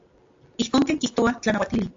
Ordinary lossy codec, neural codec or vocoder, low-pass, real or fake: AAC, 48 kbps; none; 7.2 kHz; real